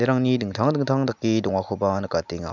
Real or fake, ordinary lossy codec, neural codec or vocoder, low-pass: real; none; none; 7.2 kHz